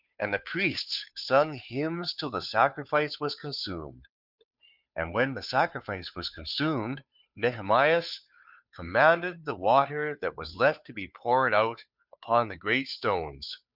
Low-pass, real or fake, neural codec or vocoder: 5.4 kHz; fake; codec, 16 kHz, 2 kbps, FunCodec, trained on Chinese and English, 25 frames a second